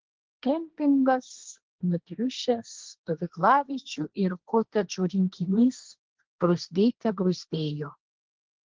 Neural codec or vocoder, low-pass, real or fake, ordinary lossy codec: codec, 16 kHz, 1.1 kbps, Voila-Tokenizer; 7.2 kHz; fake; Opus, 16 kbps